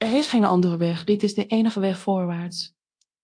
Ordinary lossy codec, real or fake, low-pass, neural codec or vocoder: AAC, 64 kbps; fake; 9.9 kHz; codec, 24 kHz, 0.9 kbps, DualCodec